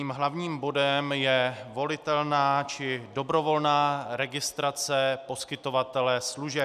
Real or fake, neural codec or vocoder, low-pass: real; none; 14.4 kHz